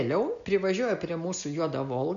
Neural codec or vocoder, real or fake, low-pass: none; real; 7.2 kHz